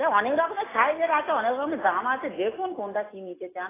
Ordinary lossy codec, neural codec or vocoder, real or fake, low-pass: AAC, 16 kbps; none; real; 3.6 kHz